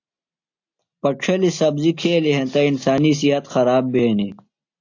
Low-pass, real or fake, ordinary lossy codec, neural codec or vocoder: 7.2 kHz; real; AAC, 48 kbps; none